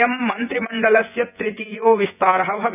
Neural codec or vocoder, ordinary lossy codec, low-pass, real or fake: vocoder, 24 kHz, 100 mel bands, Vocos; none; 3.6 kHz; fake